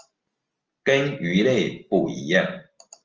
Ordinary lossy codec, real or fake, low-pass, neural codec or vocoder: Opus, 24 kbps; real; 7.2 kHz; none